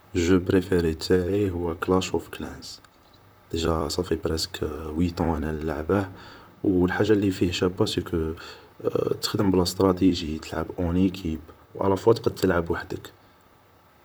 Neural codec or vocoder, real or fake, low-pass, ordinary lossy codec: vocoder, 44.1 kHz, 128 mel bands, Pupu-Vocoder; fake; none; none